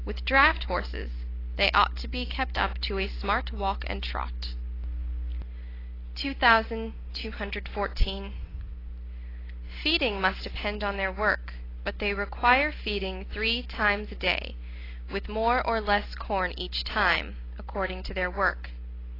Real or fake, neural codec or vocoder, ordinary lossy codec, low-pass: real; none; AAC, 24 kbps; 5.4 kHz